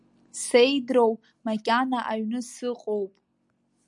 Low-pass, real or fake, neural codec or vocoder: 10.8 kHz; real; none